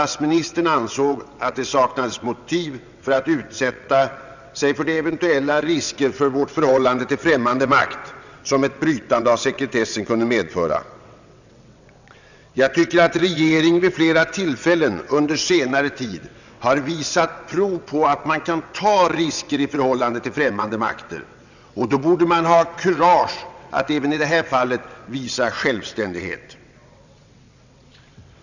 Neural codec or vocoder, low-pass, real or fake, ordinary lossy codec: vocoder, 22.05 kHz, 80 mel bands, WaveNeXt; 7.2 kHz; fake; none